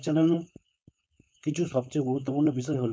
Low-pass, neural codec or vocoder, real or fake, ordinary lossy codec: none; codec, 16 kHz, 4.8 kbps, FACodec; fake; none